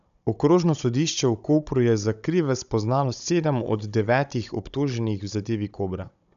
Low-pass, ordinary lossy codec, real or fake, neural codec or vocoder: 7.2 kHz; none; fake; codec, 16 kHz, 16 kbps, FunCodec, trained on Chinese and English, 50 frames a second